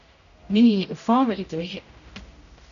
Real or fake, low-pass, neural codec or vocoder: fake; 7.2 kHz; codec, 16 kHz, 0.5 kbps, X-Codec, HuBERT features, trained on general audio